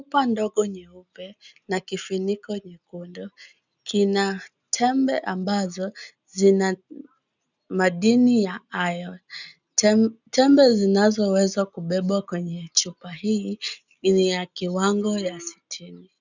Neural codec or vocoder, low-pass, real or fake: none; 7.2 kHz; real